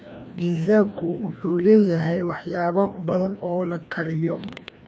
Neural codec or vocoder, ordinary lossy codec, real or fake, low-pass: codec, 16 kHz, 1 kbps, FreqCodec, larger model; none; fake; none